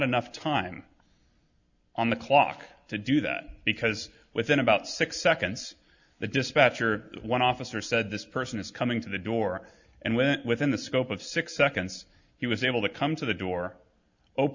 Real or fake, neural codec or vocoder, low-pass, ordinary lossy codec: real; none; 7.2 kHz; Opus, 64 kbps